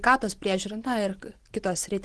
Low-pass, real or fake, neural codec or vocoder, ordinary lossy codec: 10.8 kHz; real; none; Opus, 16 kbps